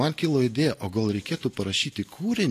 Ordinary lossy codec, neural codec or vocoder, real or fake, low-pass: AAC, 48 kbps; none; real; 14.4 kHz